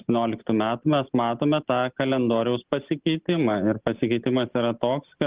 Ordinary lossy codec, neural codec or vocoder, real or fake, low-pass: Opus, 16 kbps; none; real; 3.6 kHz